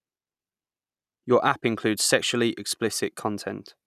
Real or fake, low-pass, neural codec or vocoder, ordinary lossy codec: real; 14.4 kHz; none; none